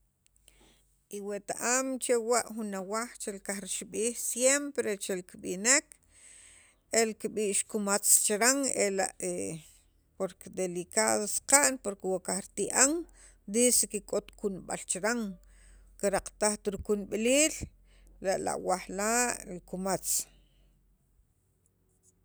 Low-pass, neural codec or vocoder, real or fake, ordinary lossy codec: none; none; real; none